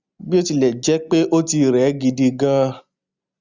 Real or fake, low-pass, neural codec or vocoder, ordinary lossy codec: real; 7.2 kHz; none; Opus, 64 kbps